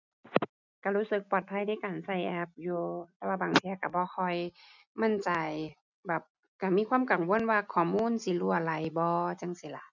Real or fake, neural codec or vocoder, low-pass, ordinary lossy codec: real; none; 7.2 kHz; none